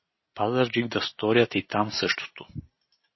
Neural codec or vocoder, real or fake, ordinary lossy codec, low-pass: none; real; MP3, 24 kbps; 7.2 kHz